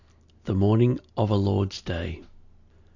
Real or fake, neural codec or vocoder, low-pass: real; none; 7.2 kHz